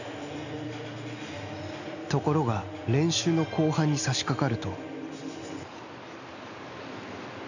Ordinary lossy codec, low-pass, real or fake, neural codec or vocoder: none; 7.2 kHz; real; none